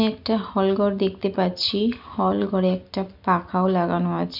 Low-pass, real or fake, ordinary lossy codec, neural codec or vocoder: 5.4 kHz; real; none; none